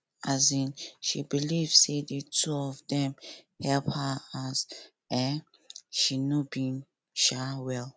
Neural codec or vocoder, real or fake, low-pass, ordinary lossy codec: none; real; none; none